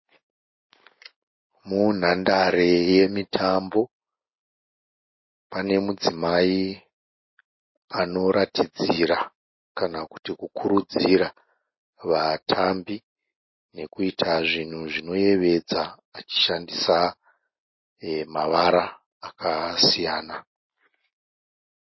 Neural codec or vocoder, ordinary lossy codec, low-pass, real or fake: none; MP3, 24 kbps; 7.2 kHz; real